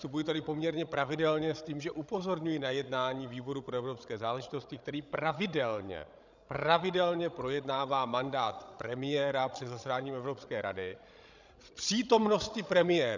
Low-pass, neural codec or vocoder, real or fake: 7.2 kHz; codec, 16 kHz, 16 kbps, FreqCodec, larger model; fake